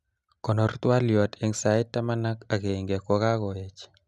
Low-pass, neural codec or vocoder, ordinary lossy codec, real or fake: none; none; none; real